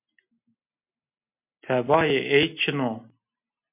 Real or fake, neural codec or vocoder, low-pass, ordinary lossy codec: real; none; 3.6 kHz; MP3, 32 kbps